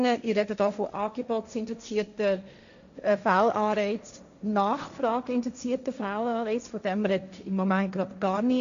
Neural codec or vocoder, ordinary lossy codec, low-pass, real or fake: codec, 16 kHz, 1.1 kbps, Voila-Tokenizer; none; 7.2 kHz; fake